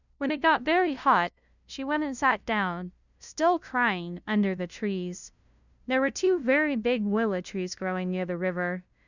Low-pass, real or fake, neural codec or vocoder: 7.2 kHz; fake; codec, 16 kHz, 0.5 kbps, FunCodec, trained on LibriTTS, 25 frames a second